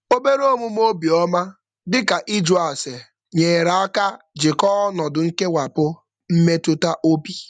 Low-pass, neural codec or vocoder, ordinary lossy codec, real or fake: 9.9 kHz; none; none; real